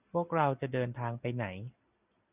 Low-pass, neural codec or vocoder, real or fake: 3.6 kHz; none; real